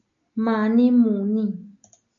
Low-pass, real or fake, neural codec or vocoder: 7.2 kHz; real; none